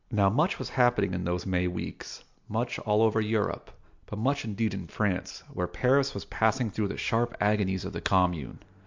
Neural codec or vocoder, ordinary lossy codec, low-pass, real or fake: none; MP3, 64 kbps; 7.2 kHz; real